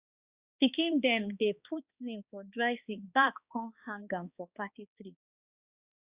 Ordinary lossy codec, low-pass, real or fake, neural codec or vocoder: Opus, 64 kbps; 3.6 kHz; fake; codec, 16 kHz, 2 kbps, X-Codec, HuBERT features, trained on balanced general audio